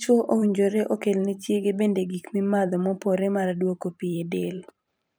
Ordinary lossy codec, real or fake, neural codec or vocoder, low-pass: none; fake; vocoder, 44.1 kHz, 128 mel bands every 512 samples, BigVGAN v2; none